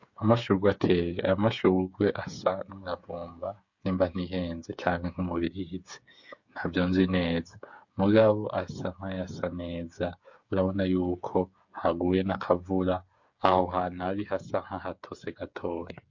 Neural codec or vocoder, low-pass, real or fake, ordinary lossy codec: codec, 16 kHz, 8 kbps, FreqCodec, smaller model; 7.2 kHz; fake; MP3, 48 kbps